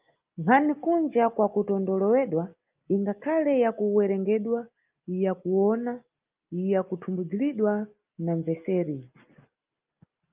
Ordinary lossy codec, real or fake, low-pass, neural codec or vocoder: Opus, 24 kbps; real; 3.6 kHz; none